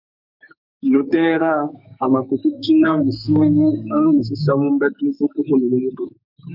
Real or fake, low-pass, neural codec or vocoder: fake; 5.4 kHz; codec, 44.1 kHz, 2.6 kbps, SNAC